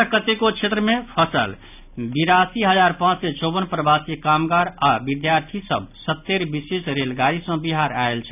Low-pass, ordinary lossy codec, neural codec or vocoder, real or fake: 3.6 kHz; none; none; real